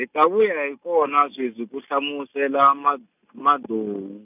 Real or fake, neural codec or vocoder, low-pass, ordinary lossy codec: real; none; 3.6 kHz; none